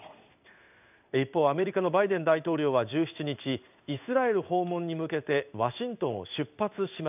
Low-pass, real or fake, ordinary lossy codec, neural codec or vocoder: 3.6 kHz; fake; none; codec, 16 kHz in and 24 kHz out, 1 kbps, XY-Tokenizer